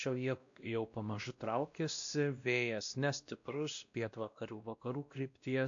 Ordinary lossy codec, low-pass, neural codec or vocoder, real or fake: AAC, 64 kbps; 7.2 kHz; codec, 16 kHz, 1 kbps, X-Codec, WavLM features, trained on Multilingual LibriSpeech; fake